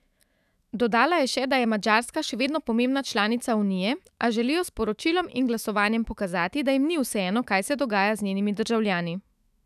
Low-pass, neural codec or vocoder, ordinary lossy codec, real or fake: 14.4 kHz; autoencoder, 48 kHz, 128 numbers a frame, DAC-VAE, trained on Japanese speech; none; fake